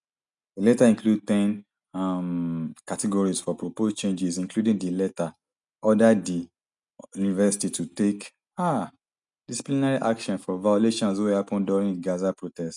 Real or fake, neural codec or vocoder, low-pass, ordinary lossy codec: real; none; 10.8 kHz; none